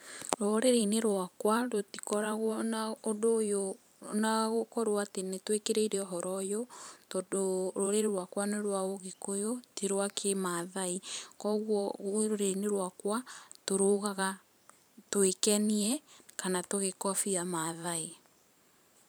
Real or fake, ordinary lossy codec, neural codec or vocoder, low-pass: fake; none; vocoder, 44.1 kHz, 128 mel bands every 512 samples, BigVGAN v2; none